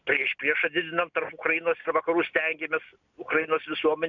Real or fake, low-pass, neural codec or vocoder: real; 7.2 kHz; none